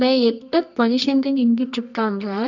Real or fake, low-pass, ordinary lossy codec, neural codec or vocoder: fake; 7.2 kHz; none; codec, 24 kHz, 0.9 kbps, WavTokenizer, medium music audio release